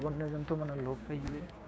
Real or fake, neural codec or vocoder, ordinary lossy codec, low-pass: fake; codec, 16 kHz, 6 kbps, DAC; none; none